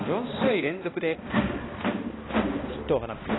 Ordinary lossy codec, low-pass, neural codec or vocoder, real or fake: AAC, 16 kbps; 7.2 kHz; codec, 16 kHz, 1 kbps, X-Codec, HuBERT features, trained on balanced general audio; fake